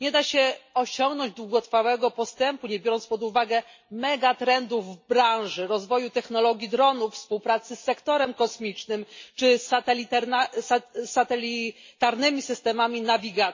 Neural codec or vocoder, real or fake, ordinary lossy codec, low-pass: none; real; MP3, 32 kbps; 7.2 kHz